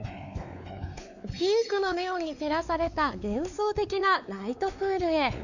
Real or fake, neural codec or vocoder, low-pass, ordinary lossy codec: fake; codec, 16 kHz, 4 kbps, X-Codec, WavLM features, trained on Multilingual LibriSpeech; 7.2 kHz; none